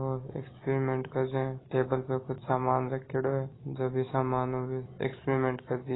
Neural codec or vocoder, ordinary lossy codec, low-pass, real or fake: none; AAC, 16 kbps; 7.2 kHz; real